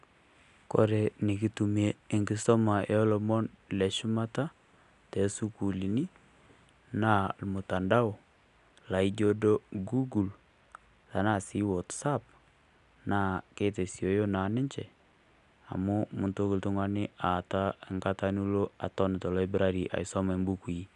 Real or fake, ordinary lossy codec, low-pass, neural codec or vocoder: real; none; 10.8 kHz; none